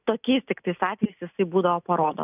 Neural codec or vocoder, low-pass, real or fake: none; 3.6 kHz; real